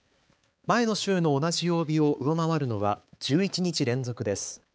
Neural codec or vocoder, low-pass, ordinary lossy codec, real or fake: codec, 16 kHz, 4 kbps, X-Codec, HuBERT features, trained on balanced general audio; none; none; fake